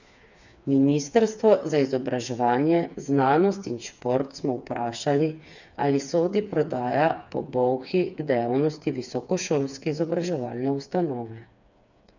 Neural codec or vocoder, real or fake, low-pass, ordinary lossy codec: codec, 16 kHz, 4 kbps, FreqCodec, smaller model; fake; 7.2 kHz; none